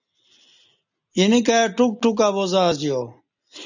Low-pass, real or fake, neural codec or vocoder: 7.2 kHz; real; none